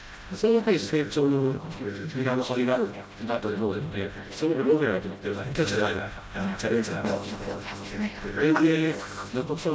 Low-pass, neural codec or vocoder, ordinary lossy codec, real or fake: none; codec, 16 kHz, 0.5 kbps, FreqCodec, smaller model; none; fake